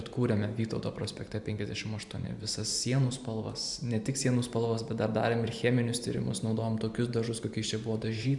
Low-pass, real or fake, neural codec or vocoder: 10.8 kHz; fake; vocoder, 44.1 kHz, 128 mel bands every 512 samples, BigVGAN v2